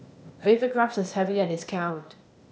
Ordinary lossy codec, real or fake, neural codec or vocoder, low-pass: none; fake; codec, 16 kHz, 0.8 kbps, ZipCodec; none